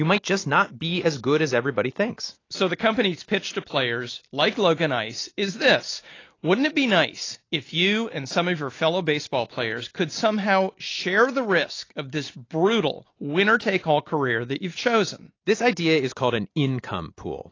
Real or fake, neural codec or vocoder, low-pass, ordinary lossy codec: real; none; 7.2 kHz; AAC, 32 kbps